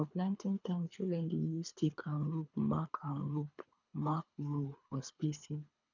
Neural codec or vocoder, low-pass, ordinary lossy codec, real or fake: codec, 24 kHz, 3 kbps, HILCodec; 7.2 kHz; none; fake